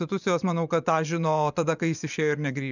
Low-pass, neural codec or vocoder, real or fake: 7.2 kHz; vocoder, 24 kHz, 100 mel bands, Vocos; fake